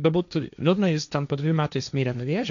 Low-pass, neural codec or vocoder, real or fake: 7.2 kHz; codec, 16 kHz, 1.1 kbps, Voila-Tokenizer; fake